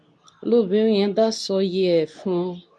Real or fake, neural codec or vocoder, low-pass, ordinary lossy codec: fake; codec, 24 kHz, 0.9 kbps, WavTokenizer, medium speech release version 2; none; none